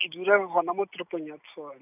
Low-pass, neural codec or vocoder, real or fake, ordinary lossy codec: 3.6 kHz; none; real; none